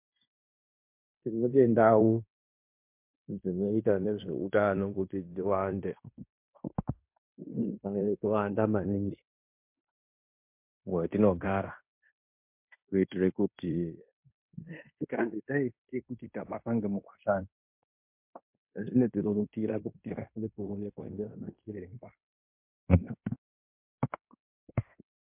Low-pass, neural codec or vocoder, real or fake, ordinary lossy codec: 3.6 kHz; codec, 16 kHz in and 24 kHz out, 0.9 kbps, LongCat-Audio-Codec, fine tuned four codebook decoder; fake; MP3, 32 kbps